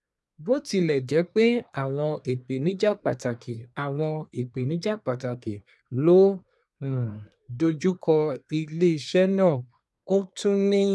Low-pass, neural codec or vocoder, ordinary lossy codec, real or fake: none; codec, 24 kHz, 1 kbps, SNAC; none; fake